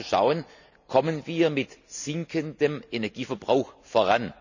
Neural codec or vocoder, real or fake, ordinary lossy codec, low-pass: none; real; none; 7.2 kHz